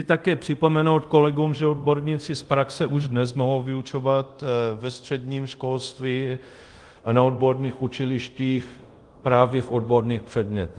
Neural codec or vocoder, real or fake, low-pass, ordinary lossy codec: codec, 24 kHz, 0.5 kbps, DualCodec; fake; 10.8 kHz; Opus, 24 kbps